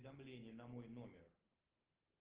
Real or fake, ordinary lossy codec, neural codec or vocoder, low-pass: real; Opus, 16 kbps; none; 3.6 kHz